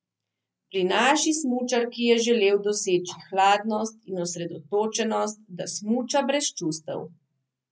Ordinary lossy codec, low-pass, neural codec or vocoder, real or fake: none; none; none; real